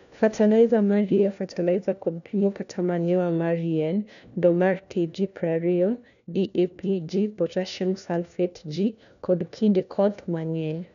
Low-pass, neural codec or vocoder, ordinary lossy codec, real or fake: 7.2 kHz; codec, 16 kHz, 1 kbps, FunCodec, trained on LibriTTS, 50 frames a second; none; fake